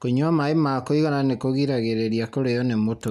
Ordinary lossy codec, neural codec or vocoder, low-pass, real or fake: none; none; 14.4 kHz; real